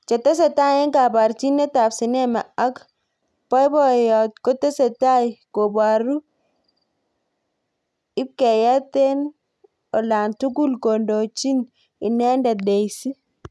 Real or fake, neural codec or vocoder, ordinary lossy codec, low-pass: real; none; none; none